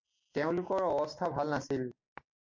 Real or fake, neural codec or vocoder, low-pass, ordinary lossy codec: real; none; 7.2 kHz; MP3, 48 kbps